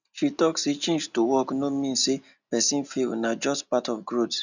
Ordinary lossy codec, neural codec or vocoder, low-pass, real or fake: none; none; 7.2 kHz; real